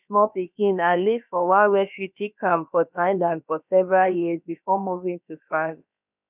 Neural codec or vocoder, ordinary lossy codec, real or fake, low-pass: codec, 16 kHz, about 1 kbps, DyCAST, with the encoder's durations; none; fake; 3.6 kHz